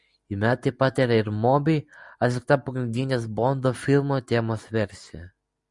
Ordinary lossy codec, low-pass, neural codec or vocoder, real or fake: Opus, 64 kbps; 10.8 kHz; none; real